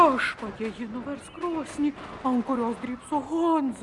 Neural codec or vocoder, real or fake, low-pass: none; real; 10.8 kHz